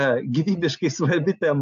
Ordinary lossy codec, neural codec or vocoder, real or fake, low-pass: AAC, 64 kbps; none; real; 7.2 kHz